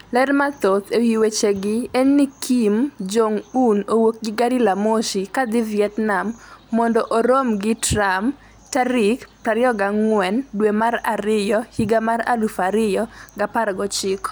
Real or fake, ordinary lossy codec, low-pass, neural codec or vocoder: real; none; none; none